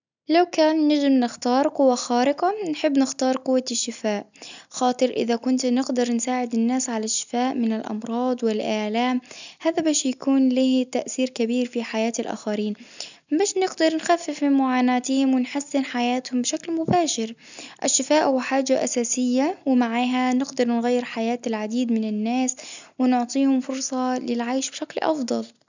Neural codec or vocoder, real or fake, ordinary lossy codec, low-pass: none; real; none; 7.2 kHz